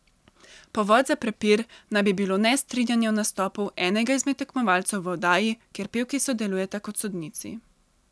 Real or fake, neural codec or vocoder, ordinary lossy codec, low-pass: real; none; none; none